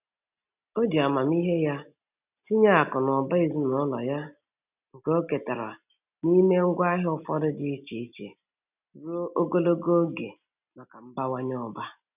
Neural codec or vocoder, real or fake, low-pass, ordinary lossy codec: none; real; 3.6 kHz; none